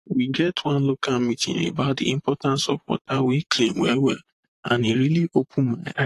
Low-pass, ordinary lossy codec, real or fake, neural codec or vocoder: 14.4 kHz; AAC, 48 kbps; fake; vocoder, 44.1 kHz, 128 mel bands, Pupu-Vocoder